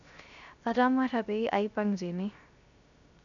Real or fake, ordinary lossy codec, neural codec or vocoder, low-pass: fake; none; codec, 16 kHz, 0.3 kbps, FocalCodec; 7.2 kHz